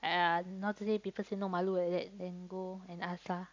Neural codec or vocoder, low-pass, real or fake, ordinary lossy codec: none; 7.2 kHz; real; AAC, 48 kbps